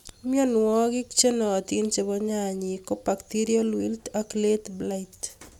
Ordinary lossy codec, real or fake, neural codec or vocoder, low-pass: none; real; none; 19.8 kHz